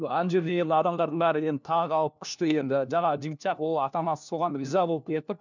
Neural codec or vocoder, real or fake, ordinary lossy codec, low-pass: codec, 16 kHz, 1 kbps, FunCodec, trained on LibriTTS, 50 frames a second; fake; none; 7.2 kHz